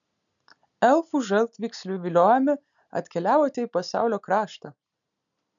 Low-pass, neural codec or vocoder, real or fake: 7.2 kHz; none; real